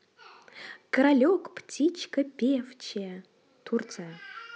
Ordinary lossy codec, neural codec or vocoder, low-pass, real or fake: none; none; none; real